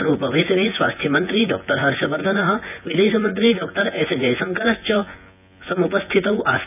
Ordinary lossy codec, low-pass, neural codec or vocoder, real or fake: none; 3.6 kHz; vocoder, 24 kHz, 100 mel bands, Vocos; fake